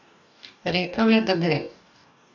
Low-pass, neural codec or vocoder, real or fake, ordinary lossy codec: 7.2 kHz; codec, 44.1 kHz, 2.6 kbps, DAC; fake; none